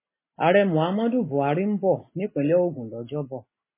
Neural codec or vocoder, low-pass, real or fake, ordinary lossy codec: none; 3.6 kHz; real; MP3, 16 kbps